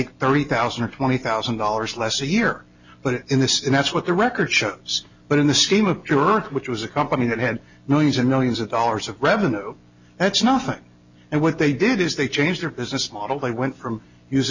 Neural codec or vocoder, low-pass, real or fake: none; 7.2 kHz; real